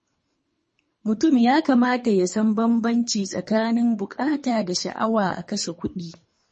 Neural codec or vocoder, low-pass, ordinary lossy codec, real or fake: codec, 24 kHz, 3 kbps, HILCodec; 10.8 kHz; MP3, 32 kbps; fake